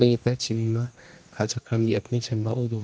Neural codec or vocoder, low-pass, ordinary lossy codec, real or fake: codec, 16 kHz, 1 kbps, X-Codec, HuBERT features, trained on general audio; none; none; fake